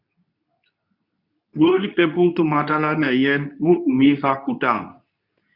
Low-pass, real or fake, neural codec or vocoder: 5.4 kHz; fake; codec, 24 kHz, 0.9 kbps, WavTokenizer, medium speech release version 2